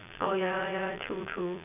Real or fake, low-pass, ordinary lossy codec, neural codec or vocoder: fake; 3.6 kHz; none; vocoder, 22.05 kHz, 80 mel bands, Vocos